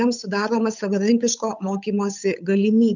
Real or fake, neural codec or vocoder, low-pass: fake; codec, 16 kHz, 8 kbps, FunCodec, trained on Chinese and English, 25 frames a second; 7.2 kHz